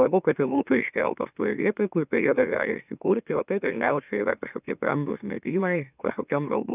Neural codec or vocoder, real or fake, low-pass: autoencoder, 44.1 kHz, a latent of 192 numbers a frame, MeloTTS; fake; 3.6 kHz